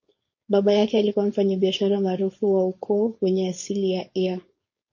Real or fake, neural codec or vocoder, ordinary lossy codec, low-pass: fake; codec, 16 kHz, 4.8 kbps, FACodec; MP3, 32 kbps; 7.2 kHz